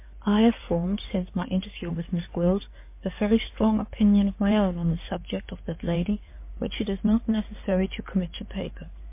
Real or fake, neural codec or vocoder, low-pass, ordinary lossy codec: fake; codec, 16 kHz in and 24 kHz out, 2.2 kbps, FireRedTTS-2 codec; 3.6 kHz; MP3, 24 kbps